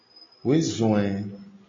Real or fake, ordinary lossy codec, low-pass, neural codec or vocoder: real; AAC, 32 kbps; 7.2 kHz; none